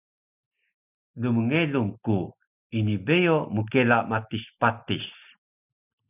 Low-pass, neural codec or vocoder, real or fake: 3.6 kHz; none; real